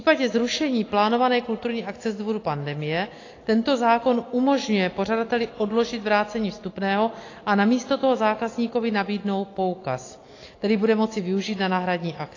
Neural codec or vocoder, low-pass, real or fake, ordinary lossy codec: none; 7.2 kHz; real; AAC, 32 kbps